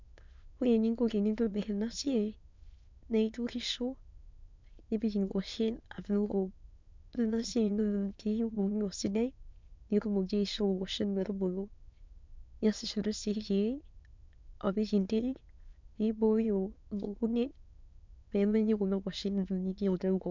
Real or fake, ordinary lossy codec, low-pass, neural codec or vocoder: fake; MP3, 64 kbps; 7.2 kHz; autoencoder, 22.05 kHz, a latent of 192 numbers a frame, VITS, trained on many speakers